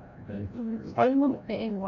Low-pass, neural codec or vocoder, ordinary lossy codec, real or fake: 7.2 kHz; codec, 16 kHz, 0.5 kbps, FreqCodec, larger model; none; fake